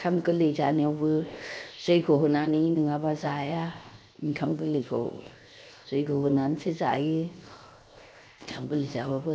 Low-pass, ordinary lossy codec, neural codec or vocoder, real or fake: none; none; codec, 16 kHz, 0.7 kbps, FocalCodec; fake